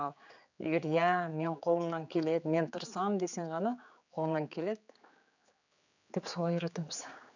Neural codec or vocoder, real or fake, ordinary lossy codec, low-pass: codec, 16 kHz, 4 kbps, X-Codec, HuBERT features, trained on general audio; fake; none; 7.2 kHz